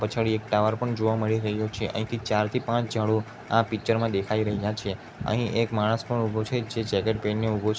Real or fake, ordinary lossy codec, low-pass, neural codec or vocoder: real; none; none; none